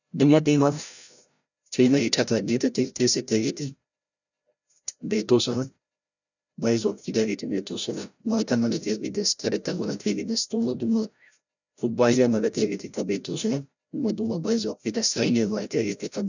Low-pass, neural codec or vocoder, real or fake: 7.2 kHz; codec, 16 kHz, 0.5 kbps, FreqCodec, larger model; fake